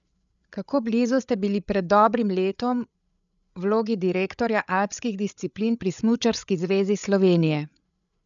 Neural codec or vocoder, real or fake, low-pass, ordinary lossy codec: codec, 16 kHz, 8 kbps, FreqCodec, larger model; fake; 7.2 kHz; none